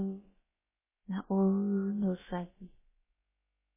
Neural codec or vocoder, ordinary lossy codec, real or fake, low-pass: codec, 16 kHz, about 1 kbps, DyCAST, with the encoder's durations; MP3, 16 kbps; fake; 3.6 kHz